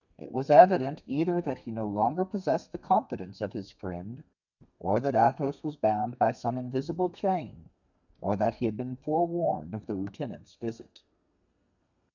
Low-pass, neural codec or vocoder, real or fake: 7.2 kHz; codec, 32 kHz, 1.9 kbps, SNAC; fake